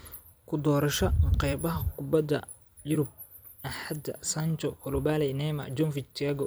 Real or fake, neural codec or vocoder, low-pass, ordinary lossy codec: fake; vocoder, 44.1 kHz, 128 mel bands every 256 samples, BigVGAN v2; none; none